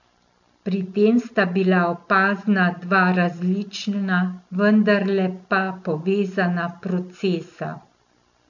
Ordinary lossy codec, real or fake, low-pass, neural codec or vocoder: none; real; 7.2 kHz; none